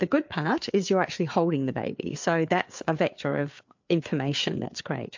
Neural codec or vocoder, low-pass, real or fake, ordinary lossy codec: codec, 16 kHz, 4 kbps, FreqCodec, larger model; 7.2 kHz; fake; MP3, 48 kbps